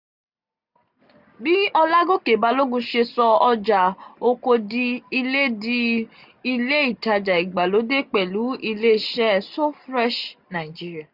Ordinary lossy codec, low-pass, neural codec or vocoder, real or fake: none; 5.4 kHz; none; real